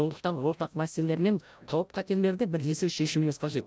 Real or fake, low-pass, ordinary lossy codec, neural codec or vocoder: fake; none; none; codec, 16 kHz, 0.5 kbps, FreqCodec, larger model